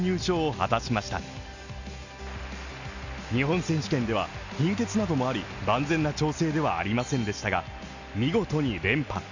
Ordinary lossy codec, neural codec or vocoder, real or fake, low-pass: none; none; real; 7.2 kHz